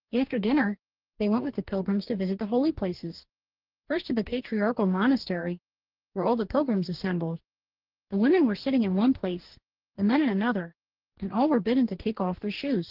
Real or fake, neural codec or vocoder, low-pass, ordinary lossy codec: fake; codec, 44.1 kHz, 2.6 kbps, DAC; 5.4 kHz; Opus, 16 kbps